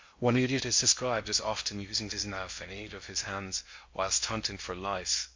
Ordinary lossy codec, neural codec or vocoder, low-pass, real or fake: MP3, 48 kbps; codec, 16 kHz in and 24 kHz out, 0.6 kbps, FocalCodec, streaming, 2048 codes; 7.2 kHz; fake